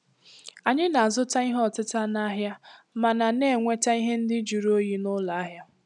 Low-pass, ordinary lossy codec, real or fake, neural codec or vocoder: 10.8 kHz; none; real; none